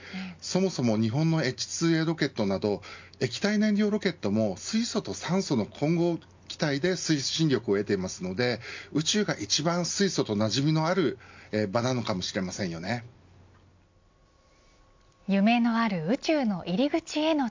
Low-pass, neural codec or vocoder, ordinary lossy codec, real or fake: 7.2 kHz; none; MP3, 64 kbps; real